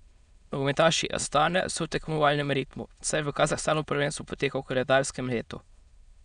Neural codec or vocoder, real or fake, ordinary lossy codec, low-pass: autoencoder, 22.05 kHz, a latent of 192 numbers a frame, VITS, trained on many speakers; fake; none; 9.9 kHz